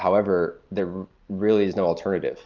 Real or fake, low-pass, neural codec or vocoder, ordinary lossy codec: real; 7.2 kHz; none; Opus, 24 kbps